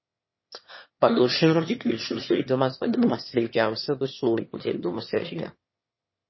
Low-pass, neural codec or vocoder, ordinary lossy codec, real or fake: 7.2 kHz; autoencoder, 22.05 kHz, a latent of 192 numbers a frame, VITS, trained on one speaker; MP3, 24 kbps; fake